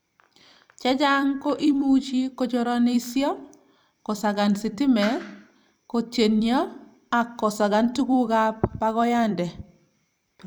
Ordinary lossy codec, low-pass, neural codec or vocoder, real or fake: none; none; vocoder, 44.1 kHz, 128 mel bands every 256 samples, BigVGAN v2; fake